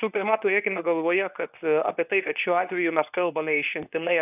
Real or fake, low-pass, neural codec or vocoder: fake; 3.6 kHz; codec, 24 kHz, 0.9 kbps, WavTokenizer, medium speech release version 2